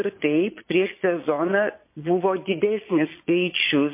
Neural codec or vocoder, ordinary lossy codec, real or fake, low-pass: vocoder, 22.05 kHz, 80 mel bands, Vocos; MP3, 24 kbps; fake; 3.6 kHz